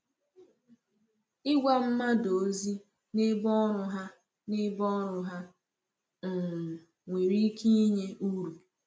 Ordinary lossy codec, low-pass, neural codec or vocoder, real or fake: none; none; none; real